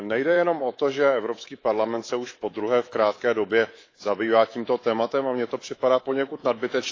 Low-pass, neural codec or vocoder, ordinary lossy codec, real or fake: 7.2 kHz; codec, 24 kHz, 3.1 kbps, DualCodec; AAC, 32 kbps; fake